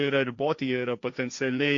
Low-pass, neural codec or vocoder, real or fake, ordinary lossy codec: 7.2 kHz; codec, 16 kHz, 1.1 kbps, Voila-Tokenizer; fake; MP3, 48 kbps